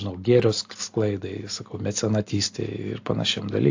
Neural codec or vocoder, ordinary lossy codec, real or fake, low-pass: none; AAC, 48 kbps; real; 7.2 kHz